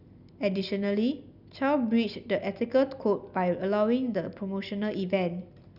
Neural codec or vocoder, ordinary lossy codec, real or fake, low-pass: none; none; real; 5.4 kHz